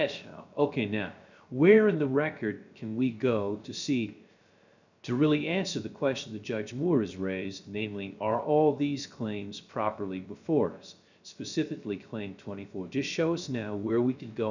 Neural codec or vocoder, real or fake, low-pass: codec, 16 kHz, 0.3 kbps, FocalCodec; fake; 7.2 kHz